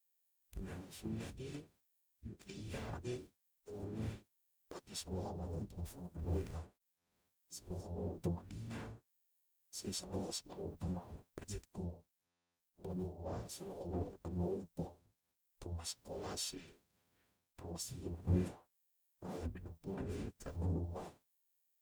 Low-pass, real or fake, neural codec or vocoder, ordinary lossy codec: none; fake; codec, 44.1 kHz, 0.9 kbps, DAC; none